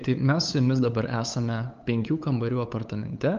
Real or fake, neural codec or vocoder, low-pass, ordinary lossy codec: fake; codec, 16 kHz, 8 kbps, FunCodec, trained on LibriTTS, 25 frames a second; 7.2 kHz; Opus, 24 kbps